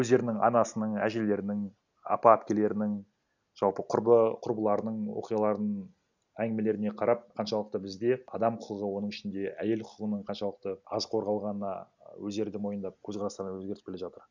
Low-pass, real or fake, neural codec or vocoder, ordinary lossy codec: 7.2 kHz; real; none; none